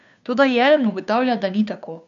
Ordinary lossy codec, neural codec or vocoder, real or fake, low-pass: none; codec, 16 kHz, 2 kbps, FunCodec, trained on Chinese and English, 25 frames a second; fake; 7.2 kHz